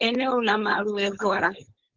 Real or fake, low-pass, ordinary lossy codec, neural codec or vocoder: fake; 7.2 kHz; Opus, 16 kbps; codec, 16 kHz, 4.8 kbps, FACodec